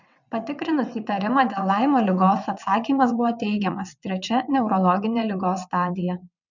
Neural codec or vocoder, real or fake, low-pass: vocoder, 22.05 kHz, 80 mel bands, WaveNeXt; fake; 7.2 kHz